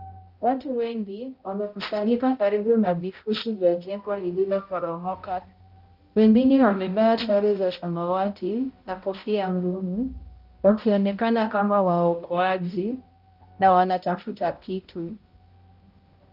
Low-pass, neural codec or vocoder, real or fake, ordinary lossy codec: 5.4 kHz; codec, 16 kHz, 0.5 kbps, X-Codec, HuBERT features, trained on balanced general audio; fake; Opus, 24 kbps